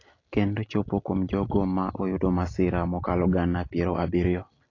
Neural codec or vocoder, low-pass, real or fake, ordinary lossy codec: none; 7.2 kHz; real; AAC, 32 kbps